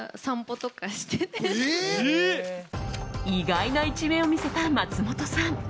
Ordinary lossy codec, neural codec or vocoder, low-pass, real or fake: none; none; none; real